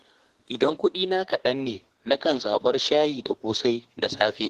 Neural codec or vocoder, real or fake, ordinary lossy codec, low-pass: codec, 32 kHz, 1.9 kbps, SNAC; fake; Opus, 16 kbps; 14.4 kHz